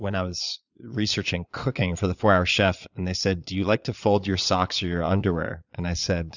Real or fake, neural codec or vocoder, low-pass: fake; vocoder, 22.05 kHz, 80 mel bands, Vocos; 7.2 kHz